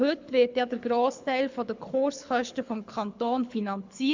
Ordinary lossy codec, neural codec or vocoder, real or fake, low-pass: MP3, 64 kbps; codec, 24 kHz, 6 kbps, HILCodec; fake; 7.2 kHz